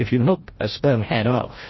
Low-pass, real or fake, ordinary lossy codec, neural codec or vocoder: 7.2 kHz; fake; MP3, 24 kbps; codec, 16 kHz, 0.5 kbps, FreqCodec, larger model